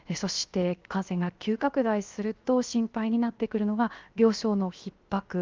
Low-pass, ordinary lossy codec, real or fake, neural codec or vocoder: 7.2 kHz; Opus, 32 kbps; fake; codec, 16 kHz, 0.7 kbps, FocalCodec